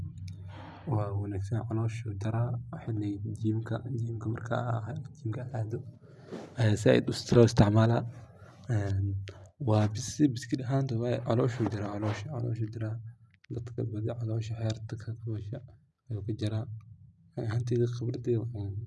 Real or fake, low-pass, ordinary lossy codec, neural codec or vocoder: real; none; none; none